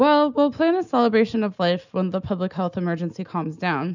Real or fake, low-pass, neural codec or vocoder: real; 7.2 kHz; none